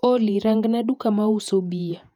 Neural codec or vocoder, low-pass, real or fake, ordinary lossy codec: vocoder, 48 kHz, 128 mel bands, Vocos; 19.8 kHz; fake; none